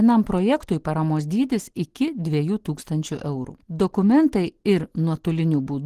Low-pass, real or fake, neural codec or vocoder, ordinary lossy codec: 14.4 kHz; real; none; Opus, 16 kbps